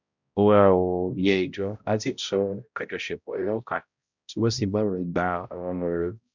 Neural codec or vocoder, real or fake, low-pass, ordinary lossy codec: codec, 16 kHz, 0.5 kbps, X-Codec, HuBERT features, trained on balanced general audio; fake; 7.2 kHz; none